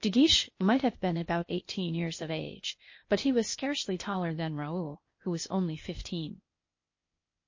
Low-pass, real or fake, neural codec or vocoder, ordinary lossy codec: 7.2 kHz; fake; codec, 16 kHz, 0.8 kbps, ZipCodec; MP3, 32 kbps